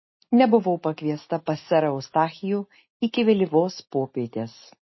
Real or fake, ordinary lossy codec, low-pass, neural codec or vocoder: real; MP3, 24 kbps; 7.2 kHz; none